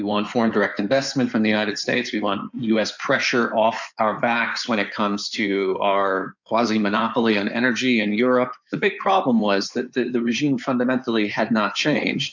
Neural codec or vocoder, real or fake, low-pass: codec, 16 kHz in and 24 kHz out, 2.2 kbps, FireRedTTS-2 codec; fake; 7.2 kHz